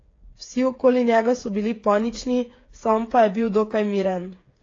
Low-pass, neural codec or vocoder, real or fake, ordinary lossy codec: 7.2 kHz; codec, 16 kHz, 8 kbps, FreqCodec, smaller model; fake; AAC, 32 kbps